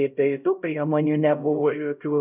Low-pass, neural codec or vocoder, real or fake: 3.6 kHz; codec, 16 kHz, 0.5 kbps, X-Codec, HuBERT features, trained on LibriSpeech; fake